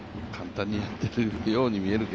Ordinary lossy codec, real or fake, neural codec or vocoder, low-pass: none; real; none; none